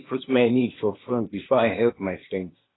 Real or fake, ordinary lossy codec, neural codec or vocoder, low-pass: fake; AAC, 16 kbps; codec, 16 kHz, 0.8 kbps, ZipCodec; 7.2 kHz